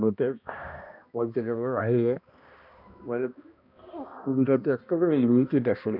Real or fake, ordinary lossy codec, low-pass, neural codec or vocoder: fake; none; 5.4 kHz; codec, 16 kHz, 1 kbps, X-Codec, HuBERT features, trained on balanced general audio